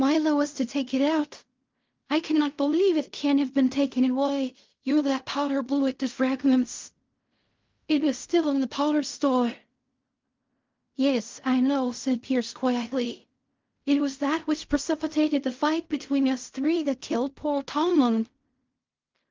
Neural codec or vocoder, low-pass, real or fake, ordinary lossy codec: codec, 16 kHz in and 24 kHz out, 0.4 kbps, LongCat-Audio-Codec, fine tuned four codebook decoder; 7.2 kHz; fake; Opus, 24 kbps